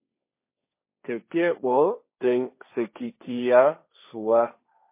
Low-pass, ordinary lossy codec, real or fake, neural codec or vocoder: 3.6 kHz; MP3, 24 kbps; fake; codec, 16 kHz, 1.1 kbps, Voila-Tokenizer